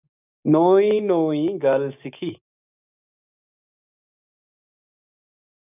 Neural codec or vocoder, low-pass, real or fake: codec, 16 kHz, 6 kbps, DAC; 3.6 kHz; fake